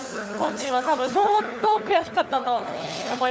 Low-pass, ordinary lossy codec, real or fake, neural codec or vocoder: none; none; fake; codec, 16 kHz, 4 kbps, FunCodec, trained on LibriTTS, 50 frames a second